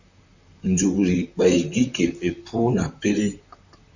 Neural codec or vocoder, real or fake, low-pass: vocoder, 44.1 kHz, 128 mel bands, Pupu-Vocoder; fake; 7.2 kHz